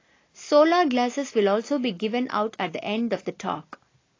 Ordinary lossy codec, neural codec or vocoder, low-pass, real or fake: AAC, 32 kbps; none; 7.2 kHz; real